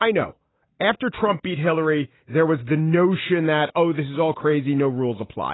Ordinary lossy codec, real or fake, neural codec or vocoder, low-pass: AAC, 16 kbps; real; none; 7.2 kHz